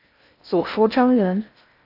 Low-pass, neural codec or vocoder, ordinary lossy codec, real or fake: 5.4 kHz; codec, 16 kHz in and 24 kHz out, 0.6 kbps, FocalCodec, streaming, 4096 codes; AAC, 32 kbps; fake